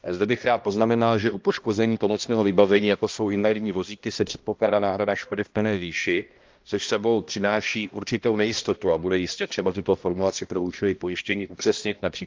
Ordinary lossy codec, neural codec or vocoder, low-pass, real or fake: Opus, 32 kbps; codec, 16 kHz, 1 kbps, X-Codec, HuBERT features, trained on balanced general audio; 7.2 kHz; fake